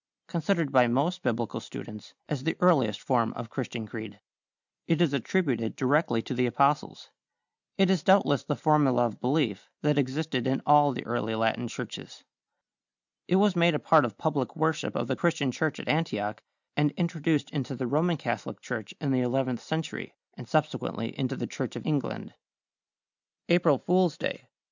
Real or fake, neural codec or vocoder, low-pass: real; none; 7.2 kHz